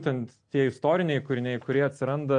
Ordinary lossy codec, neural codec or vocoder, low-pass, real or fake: AAC, 64 kbps; none; 9.9 kHz; real